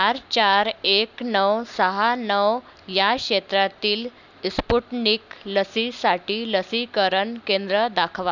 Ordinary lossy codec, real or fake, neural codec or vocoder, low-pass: none; real; none; 7.2 kHz